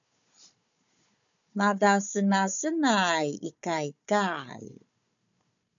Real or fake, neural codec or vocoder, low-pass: fake; codec, 16 kHz, 4 kbps, FunCodec, trained on Chinese and English, 50 frames a second; 7.2 kHz